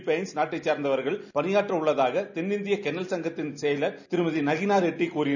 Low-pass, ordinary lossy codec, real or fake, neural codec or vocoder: 7.2 kHz; none; real; none